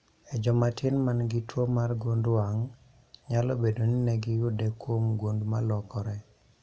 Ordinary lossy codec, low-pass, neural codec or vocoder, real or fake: none; none; none; real